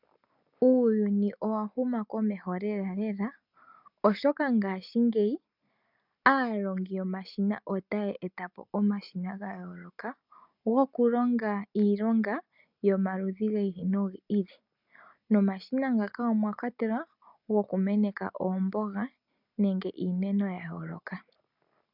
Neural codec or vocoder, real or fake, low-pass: none; real; 5.4 kHz